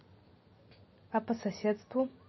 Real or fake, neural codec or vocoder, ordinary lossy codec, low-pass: real; none; MP3, 24 kbps; 7.2 kHz